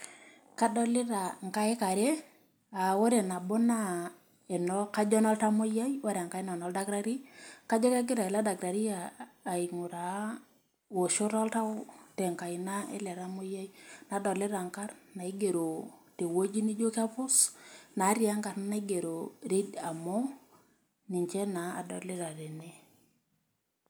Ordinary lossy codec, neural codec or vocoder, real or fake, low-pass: none; none; real; none